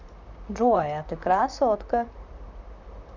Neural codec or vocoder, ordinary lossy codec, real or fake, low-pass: vocoder, 44.1 kHz, 128 mel bands, Pupu-Vocoder; none; fake; 7.2 kHz